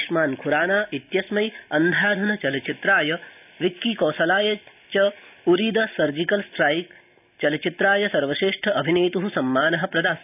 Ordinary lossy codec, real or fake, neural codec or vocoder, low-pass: none; real; none; 3.6 kHz